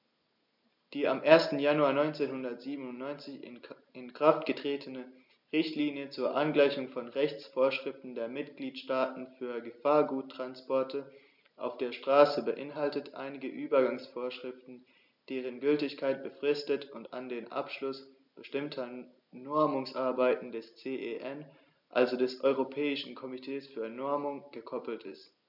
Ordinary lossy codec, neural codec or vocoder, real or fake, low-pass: none; none; real; 5.4 kHz